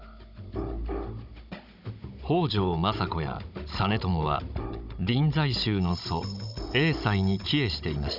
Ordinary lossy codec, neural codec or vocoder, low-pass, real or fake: none; codec, 16 kHz, 16 kbps, FunCodec, trained on Chinese and English, 50 frames a second; 5.4 kHz; fake